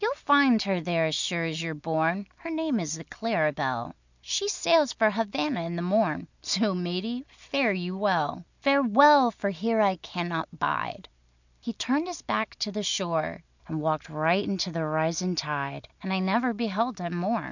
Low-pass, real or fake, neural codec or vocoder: 7.2 kHz; real; none